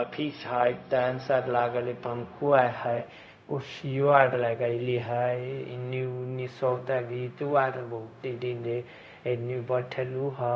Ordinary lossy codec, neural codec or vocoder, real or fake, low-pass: none; codec, 16 kHz, 0.4 kbps, LongCat-Audio-Codec; fake; none